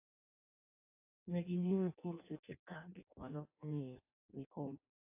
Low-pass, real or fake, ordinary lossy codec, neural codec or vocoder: 3.6 kHz; fake; AAC, 32 kbps; codec, 16 kHz in and 24 kHz out, 0.6 kbps, FireRedTTS-2 codec